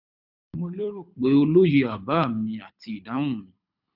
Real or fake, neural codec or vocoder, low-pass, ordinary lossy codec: fake; codec, 24 kHz, 6 kbps, HILCodec; 5.4 kHz; none